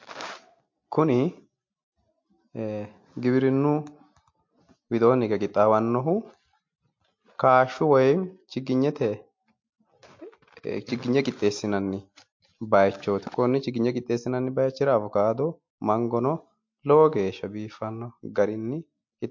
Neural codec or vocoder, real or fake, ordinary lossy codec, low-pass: none; real; MP3, 48 kbps; 7.2 kHz